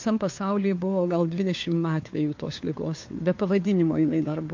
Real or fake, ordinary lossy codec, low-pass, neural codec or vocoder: fake; MP3, 48 kbps; 7.2 kHz; codec, 16 kHz, 4 kbps, FunCodec, trained on LibriTTS, 50 frames a second